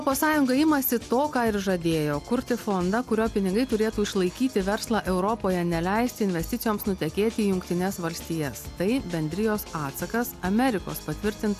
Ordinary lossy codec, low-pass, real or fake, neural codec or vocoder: AAC, 96 kbps; 14.4 kHz; real; none